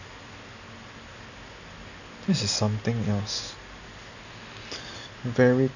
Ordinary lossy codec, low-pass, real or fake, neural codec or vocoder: none; 7.2 kHz; real; none